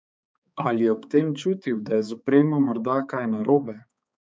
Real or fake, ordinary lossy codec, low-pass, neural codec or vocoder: fake; none; none; codec, 16 kHz, 4 kbps, X-Codec, HuBERT features, trained on balanced general audio